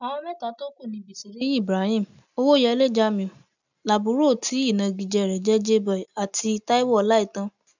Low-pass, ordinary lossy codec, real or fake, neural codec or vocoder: 7.2 kHz; none; real; none